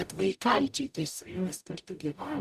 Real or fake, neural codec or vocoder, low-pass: fake; codec, 44.1 kHz, 0.9 kbps, DAC; 14.4 kHz